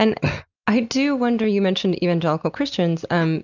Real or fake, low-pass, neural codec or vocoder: real; 7.2 kHz; none